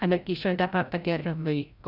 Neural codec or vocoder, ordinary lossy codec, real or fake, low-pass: codec, 16 kHz, 0.5 kbps, FreqCodec, larger model; none; fake; 5.4 kHz